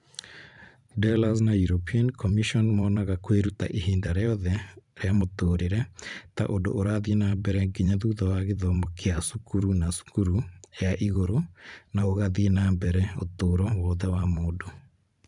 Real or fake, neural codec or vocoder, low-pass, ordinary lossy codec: fake; vocoder, 44.1 kHz, 128 mel bands every 512 samples, BigVGAN v2; 10.8 kHz; none